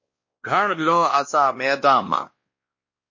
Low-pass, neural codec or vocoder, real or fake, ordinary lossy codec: 7.2 kHz; codec, 16 kHz, 1 kbps, X-Codec, WavLM features, trained on Multilingual LibriSpeech; fake; MP3, 32 kbps